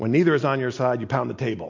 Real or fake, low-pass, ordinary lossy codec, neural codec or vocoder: real; 7.2 kHz; MP3, 48 kbps; none